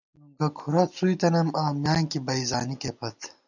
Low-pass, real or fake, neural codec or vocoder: 7.2 kHz; real; none